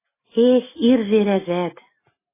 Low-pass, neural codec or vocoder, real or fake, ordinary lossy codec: 3.6 kHz; none; real; MP3, 16 kbps